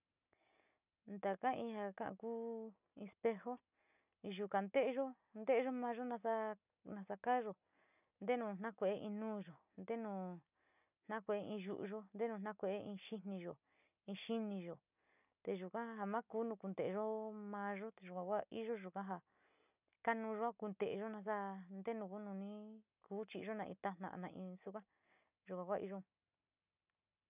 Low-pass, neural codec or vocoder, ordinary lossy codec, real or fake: 3.6 kHz; none; none; real